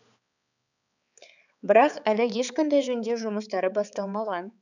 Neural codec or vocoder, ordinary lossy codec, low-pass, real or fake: codec, 16 kHz, 4 kbps, X-Codec, HuBERT features, trained on balanced general audio; none; 7.2 kHz; fake